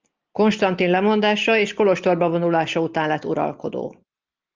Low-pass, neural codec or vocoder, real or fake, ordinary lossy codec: 7.2 kHz; none; real; Opus, 24 kbps